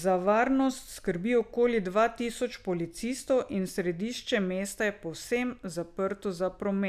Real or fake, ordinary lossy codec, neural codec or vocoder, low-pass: real; none; none; 14.4 kHz